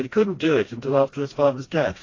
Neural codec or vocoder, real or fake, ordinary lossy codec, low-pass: codec, 16 kHz, 1 kbps, FreqCodec, smaller model; fake; AAC, 32 kbps; 7.2 kHz